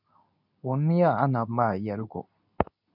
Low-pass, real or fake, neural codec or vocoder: 5.4 kHz; fake; codec, 24 kHz, 0.9 kbps, WavTokenizer, medium speech release version 2